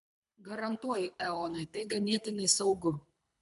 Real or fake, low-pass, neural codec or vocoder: fake; 10.8 kHz; codec, 24 kHz, 3 kbps, HILCodec